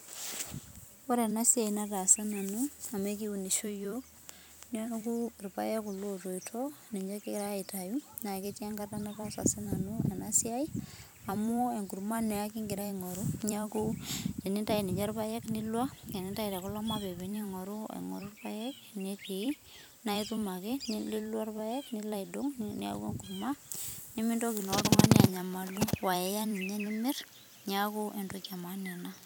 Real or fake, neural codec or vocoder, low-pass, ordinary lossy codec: fake; vocoder, 44.1 kHz, 128 mel bands every 256 samples, BigVGAN v2; none; none